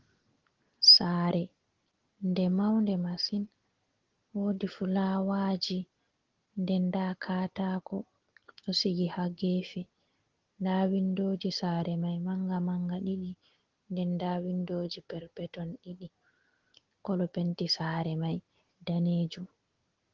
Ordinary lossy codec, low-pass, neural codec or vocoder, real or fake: Opus, 16 kbps; 7.2 kHz; none; real